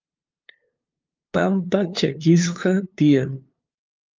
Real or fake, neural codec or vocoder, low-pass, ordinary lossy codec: fake; codec, 16 kHz, 2 kbps, FunCodec, trained on LibriTTS, 25 frames a second; 7.2 kHz; Opus, 32 kbps